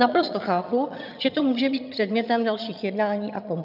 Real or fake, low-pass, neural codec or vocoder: fake; 5.4 kHz; vocoder, 22.05 kHz, 80 mel bands, HiFi-GAN